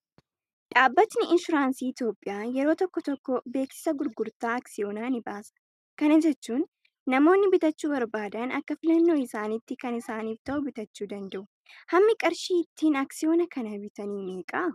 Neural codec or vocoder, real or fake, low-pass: none; real; 14.4 kHz